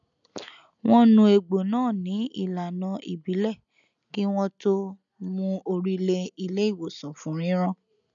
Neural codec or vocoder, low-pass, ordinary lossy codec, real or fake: none; 7.2 kHz; none; real